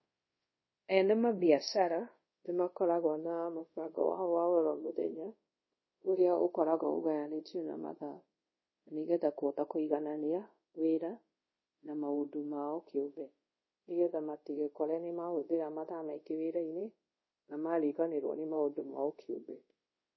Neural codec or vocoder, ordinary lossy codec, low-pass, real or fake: codec, 24 kHz, 0.5 kbps, DualCodec; MP3, 24 kbps; 7.2 kHz; fake